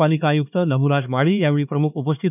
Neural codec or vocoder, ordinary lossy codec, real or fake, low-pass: codec, 16 kHz, 2 kbps, X-Codec, HuBERT features, trained on LibriSpeech; none; fake; 3.6 kHz